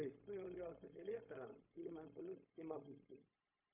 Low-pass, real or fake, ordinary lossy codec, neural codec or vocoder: 3.6 kHz; fake; AAC, 24 kbps; codec, 16 kHz, 0.4 kbps, LongCat-Audio-Codec